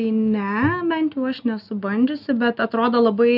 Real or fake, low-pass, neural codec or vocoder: real; 5.4 kHz; none